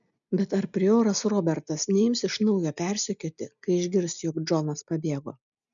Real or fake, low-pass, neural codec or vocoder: real; 7.2 kHz; none